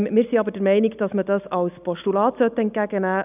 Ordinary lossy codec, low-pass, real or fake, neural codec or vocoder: none; 3.6 kHz; real; none